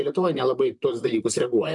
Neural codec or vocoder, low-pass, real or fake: vocoder, 44.1 kHz, 128 mel bands, Pupu-Vocoder; 10.8 kHz; fake